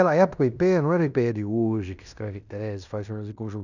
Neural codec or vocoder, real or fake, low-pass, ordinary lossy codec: codec, 16 kHz in and 24 kHz out, 0.9 kbps, LongCat-Audio-Codec, fine tuned four codebook decoder; fake; 7.2 kHz; none